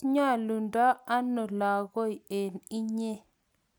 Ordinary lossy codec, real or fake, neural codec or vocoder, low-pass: none; real; none; none